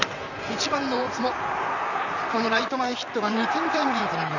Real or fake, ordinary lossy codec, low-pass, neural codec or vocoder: fake; none; 7.2 kHz; vocoder, 44.1 kHz, 128 mel bands, Pupu-Vocoder